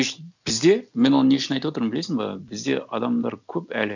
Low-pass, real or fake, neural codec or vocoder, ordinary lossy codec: 7.2 kHz; real; none; none